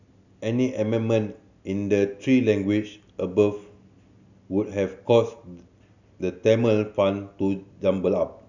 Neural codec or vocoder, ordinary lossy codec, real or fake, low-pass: none; none; real; 7.2 kHz